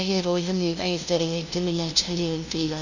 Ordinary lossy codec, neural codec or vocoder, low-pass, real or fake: none; codec, 16 kHz, 0.5 kbps, FunCodec, trained on LibriTTS, 25 frames a second; 7.2 kHz; fake